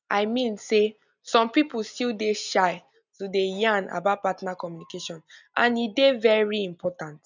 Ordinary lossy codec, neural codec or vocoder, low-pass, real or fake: none; none; 7.2 kHz; real